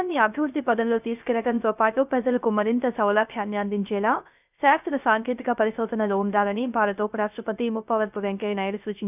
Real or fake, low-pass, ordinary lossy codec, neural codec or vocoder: fake; 3.6 kHz; none; codec, 16 kHz, 0.3 kbps, FocalCodec